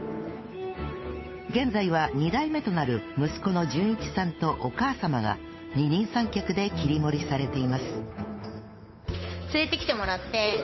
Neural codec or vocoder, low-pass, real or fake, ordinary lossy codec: codec, 16 kHz, 8 kbps, FunCodec, trained on Chinese and English, 25 frames a second; 7.2 kHz; fake; MP3, 24 kbps